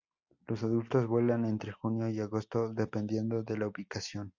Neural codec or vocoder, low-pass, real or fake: none; 7.2 kHz; real